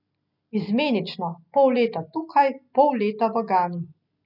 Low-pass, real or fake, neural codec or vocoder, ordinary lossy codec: 5.4 kHz; real; none; none